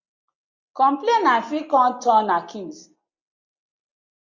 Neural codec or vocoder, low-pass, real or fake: none; 7.2 kHz; real